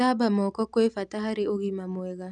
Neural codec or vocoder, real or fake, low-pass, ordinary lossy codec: none; real; 10.8 kHz; none